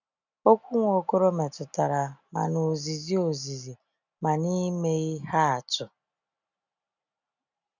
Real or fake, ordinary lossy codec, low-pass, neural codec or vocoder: real; none; 7.2 kHz; none